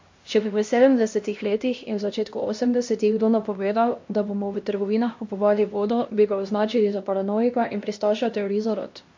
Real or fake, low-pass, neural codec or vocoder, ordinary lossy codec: fake; 7.2 kHz; codec, 16 kHz, 1 kbps, X-Codec, HuBERT features, trained on LibriSpeech; MP3, 48 kbps